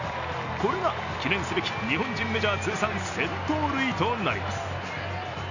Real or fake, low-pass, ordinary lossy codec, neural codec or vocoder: real; 7.2 kHz; none; none